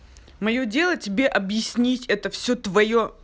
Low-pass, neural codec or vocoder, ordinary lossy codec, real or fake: none; none; none; real